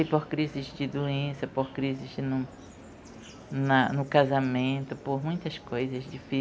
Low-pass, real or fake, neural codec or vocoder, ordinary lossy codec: none; real; none; none